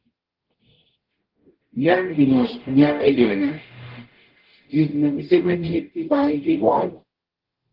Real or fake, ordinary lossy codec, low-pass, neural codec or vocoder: fake; Opus, 16 kbps; 5.4 kHz; codec, 44.1 kHz, 0.9 kbps, DAC